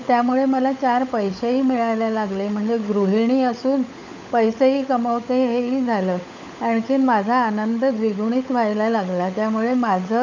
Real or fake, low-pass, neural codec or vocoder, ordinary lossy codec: fake; 7.2 kHz; codec, 16 kHz, 16 kbps, FunCodec, trained on LibriTTS, 50 frames a second; none